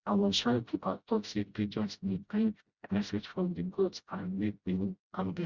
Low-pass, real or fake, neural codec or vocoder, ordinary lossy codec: 7.2 kHz; fake; codec, 16 kHz, 0.5 kbps, FreqCodec, smaller model; Opus, 64 kbps